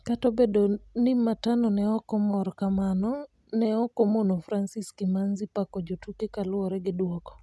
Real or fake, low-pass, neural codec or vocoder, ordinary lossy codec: real; none; none; none